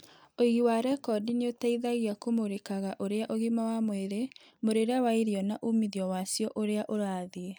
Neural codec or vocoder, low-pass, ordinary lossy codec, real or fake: none; none; none; real